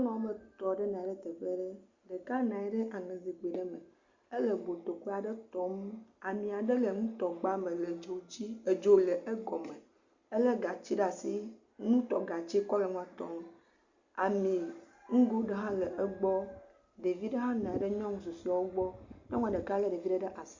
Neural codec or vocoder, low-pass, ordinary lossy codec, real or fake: none; 7.2 kHz; Opus, 64 kbps; real